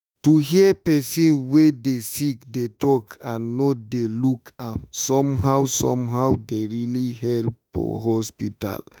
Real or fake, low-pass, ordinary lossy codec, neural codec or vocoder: fake; none; none; autoencoder, 48 kHz, 32 numbers a frame, DAC-VAE, trained on Japanese speech